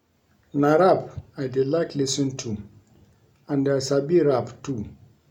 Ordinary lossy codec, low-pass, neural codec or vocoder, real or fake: none; 19.8 kHz; none; real